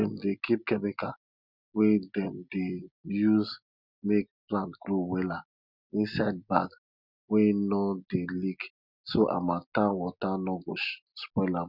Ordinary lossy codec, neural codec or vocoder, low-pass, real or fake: none; none; 5.4 kHz; real